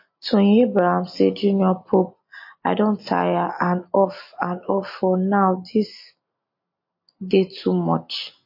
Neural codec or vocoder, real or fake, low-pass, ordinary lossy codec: none; real; 5.4 kHz; MP3, 32 kbps